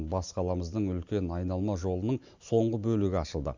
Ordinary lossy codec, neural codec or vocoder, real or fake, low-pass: none; none; real; 7.2 kHz